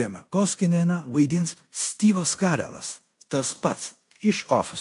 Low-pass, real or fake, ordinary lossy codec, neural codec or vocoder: 10.8 kHz; fake; AAC, 64 kbps; codec, 16 kHz in and 24 kHz out, 0.9 kbps, LongCat-Audio-Codec, fine tuned four codebook decoder